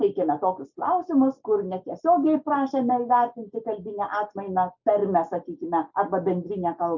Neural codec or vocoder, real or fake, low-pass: none; real; 7.2 kHz